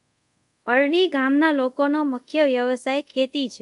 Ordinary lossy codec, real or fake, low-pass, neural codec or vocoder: none; fake; 10.8 kHz; codec, 24 kHz, 0.5 kbps, DualCodec